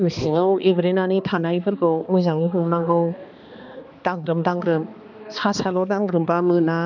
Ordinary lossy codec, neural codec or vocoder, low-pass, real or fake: none; codec, 16 kHz, 2 kbps, X-Codec, HuBERT features, trained on balanced general audio; 7.2 kHz; fake